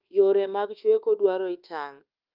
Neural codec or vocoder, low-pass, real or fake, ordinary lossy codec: codec, 24 kHz, 1.2 kbps, DualCodec; 5.4 kHz; fake; Opus, 24 kbps